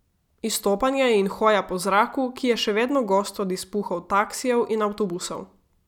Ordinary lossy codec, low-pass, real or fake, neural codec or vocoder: none; 19.8 kHz; real; none